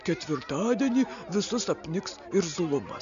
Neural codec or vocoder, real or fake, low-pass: none; real; 7.2 kHz